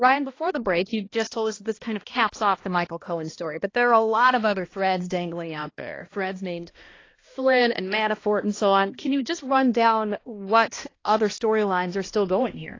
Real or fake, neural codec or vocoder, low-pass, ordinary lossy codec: fake; codec, 16 kHz, 1 kbps, X-Codec, HuBERT features, trained on balanced general audio; 7.2 kHz; AAC, 32 kbps